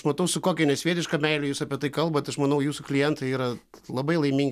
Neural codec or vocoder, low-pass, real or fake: none; 14.4 kHz; real